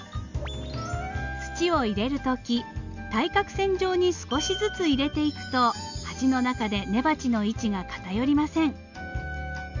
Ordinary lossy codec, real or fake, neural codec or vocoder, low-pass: none; real; none; 7.2 kHz